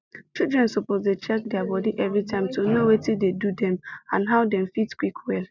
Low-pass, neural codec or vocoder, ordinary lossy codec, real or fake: 7.2 kHz; none; none; real